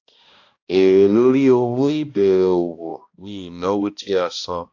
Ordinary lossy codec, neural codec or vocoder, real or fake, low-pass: AAC, 48 kbps; codec, 16 kHz, 1 kbps, X-Codec, HuBERT features, trained on balanced general audio; fake; 7.2 kHz